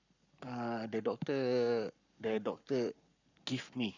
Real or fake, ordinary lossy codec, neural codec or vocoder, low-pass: fake; none; codec, 44.1 kHz, 7.8 kbps, Pupu-Codec; 7.2 kHz